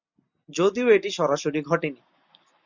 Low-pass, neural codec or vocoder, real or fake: 7.2 kHz; none; real